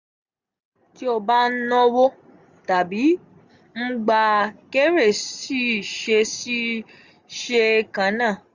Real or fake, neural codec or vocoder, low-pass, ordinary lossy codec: real; none; 7.2 kHz; Opus, 64 kbps